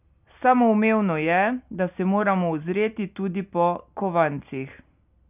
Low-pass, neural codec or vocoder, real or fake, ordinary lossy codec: 3.6 kHz; none; real; none